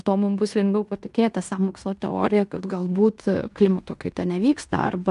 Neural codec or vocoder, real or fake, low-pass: codec, 16 kHz in and 24 kHz out, 0.9 kbps, LongCat-Audio-Codec, fine tuned four codebook decoder; fake; 10.8 kHz